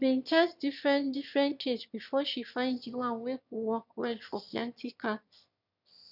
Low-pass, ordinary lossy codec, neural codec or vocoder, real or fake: 5.4 kHz; none; autoencoder, 22.05 kHz, a latent of 192 numbers a frame, VITS, trained on one speaker; fake